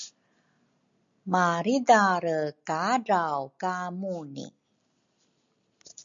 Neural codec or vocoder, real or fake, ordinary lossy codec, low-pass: none; real; MP3, 64 kbps; 7.2 kHz